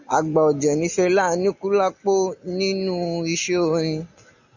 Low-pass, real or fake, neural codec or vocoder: 7.2 kHz; real; none